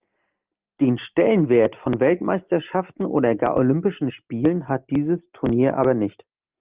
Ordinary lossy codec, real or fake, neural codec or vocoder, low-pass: Opus, 32 kbps; real; none; 3.6 kHz